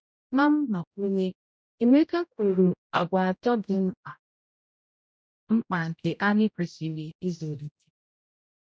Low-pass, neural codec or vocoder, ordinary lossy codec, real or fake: none; codec, 16 kHz, 0.5 kbps, X-Codec, HuBERT features, trained on general audio; none; fake